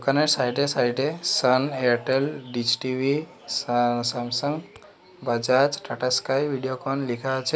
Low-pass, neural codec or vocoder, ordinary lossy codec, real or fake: none; none; none; real